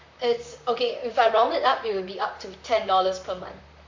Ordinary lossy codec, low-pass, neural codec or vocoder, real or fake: MP3, 48 kbps; 7.2 kHz; vocoder, 44.1 kHz, 128 mel bands, Pupu-Vocoder; fake